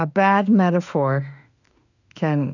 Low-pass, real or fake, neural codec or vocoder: 7.2 kHz; fake; autoencoder, 48 kHz, 32 numbers a frame, DAC-VAE, trained on Japanese speech